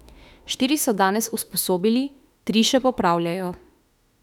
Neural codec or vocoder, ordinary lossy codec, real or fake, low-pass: autoencoder, 48 kHz, 32 numbers a frame, DAC-VAE, trained on Japanese speech; none; fake; 19.8 kHz